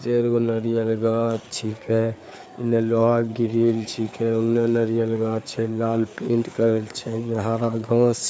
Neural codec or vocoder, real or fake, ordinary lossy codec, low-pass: codec, 16 kHz, 4 kbps, FreqCodec, larger model; fake; none; none